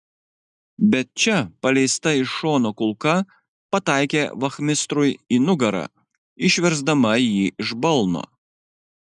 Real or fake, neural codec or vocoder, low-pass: real; none; 10.8 kHz